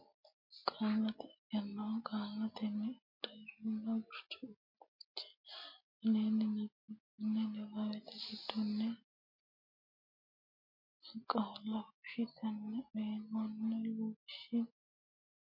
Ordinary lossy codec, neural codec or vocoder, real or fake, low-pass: MP3, 32 kbps; none; real; 5.4 kHz